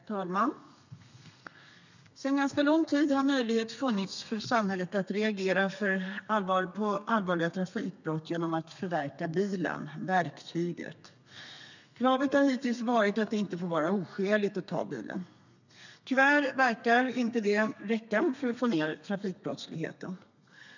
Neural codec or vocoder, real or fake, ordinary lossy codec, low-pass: codec, 32 kHz, 1.9 kbps, SNAC; fake; none; 7.2 kHz